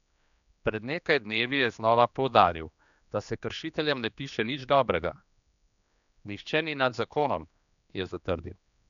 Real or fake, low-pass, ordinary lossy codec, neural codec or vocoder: fake; 7.2 kHz; none; codec, 16 kHz, 2 kbps, X-Codec, HuBERT features, trained on general audio